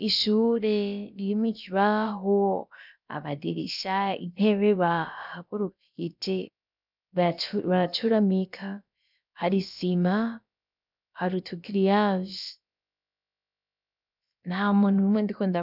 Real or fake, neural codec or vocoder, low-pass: fake; codec, 16 kHz, about 1 kbps, DyCAST, with the encoder's durations; 5.4 kHz